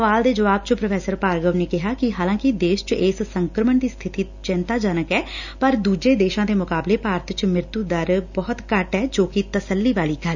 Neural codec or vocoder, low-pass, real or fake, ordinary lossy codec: none; 7.2 kHz; real; none